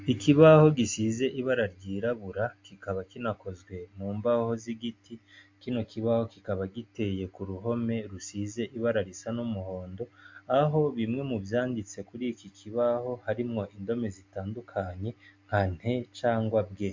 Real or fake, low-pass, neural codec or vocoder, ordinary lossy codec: real; 7.2 kHz; none; MP3, 48 kbps